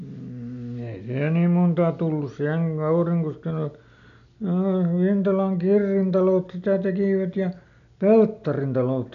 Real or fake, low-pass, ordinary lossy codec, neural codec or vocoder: real; 7.2 kHz; none; none